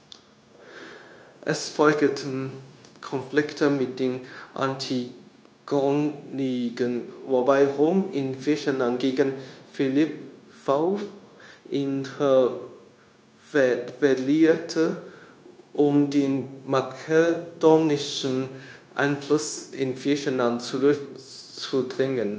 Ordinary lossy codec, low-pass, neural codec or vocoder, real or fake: none; none; codec, 16 kHz, 0.9 kbps, LongCat-Audio-Codec; fake